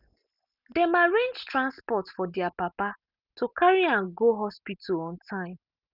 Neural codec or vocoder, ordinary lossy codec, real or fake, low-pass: none; none; real; 5.4 kHz